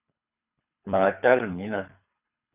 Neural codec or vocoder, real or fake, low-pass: codec, 24 kHz, 3 kbps, HILCodec; fake; 3.6 kHz